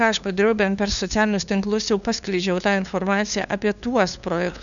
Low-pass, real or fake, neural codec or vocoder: 7.2 kHz; fake; codec, 16 kHz, 2 kbps, FunCodec, trained on LibriTTS, 25 frames a second